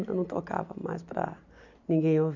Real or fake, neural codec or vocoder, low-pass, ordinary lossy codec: real; none; 7.2 kHz; none